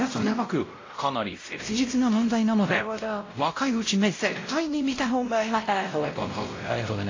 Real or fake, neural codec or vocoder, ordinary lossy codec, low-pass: fake; codec, 16 kHz, 0.5 kbps, X-Codec, WavLM features, trained on Multilingual LibriSpeech; AAC, 32 kbps; 7.2 kHz